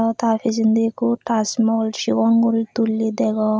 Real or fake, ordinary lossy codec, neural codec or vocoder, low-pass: real; none; none; none